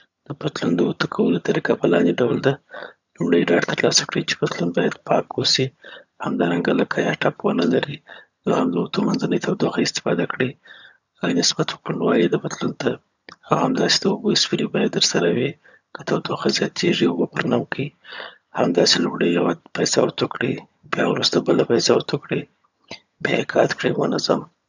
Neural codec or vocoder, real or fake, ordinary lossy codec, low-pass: vocoder, 22.05 kHz, 80 mel bands, HiFi-GAN; fake; none; 7.2 kHz